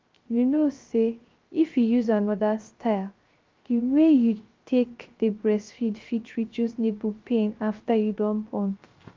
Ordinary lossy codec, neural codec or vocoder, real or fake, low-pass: Opus, 32 kbps; codec, 16 kHz, 0.3 kbps, FocalCodec; fake; 7.2 kHz